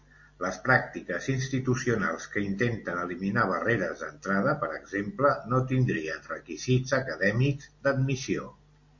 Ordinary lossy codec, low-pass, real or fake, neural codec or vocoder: MP3, 48 kbps; 7.2 kHz; real; none